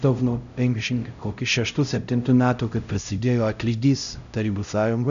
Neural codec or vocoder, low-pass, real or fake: codec, 16 kHz, 0.5 kbps, X-Codec, HuBERT features, trained on LibriSpeech; 7.2 kHz; fake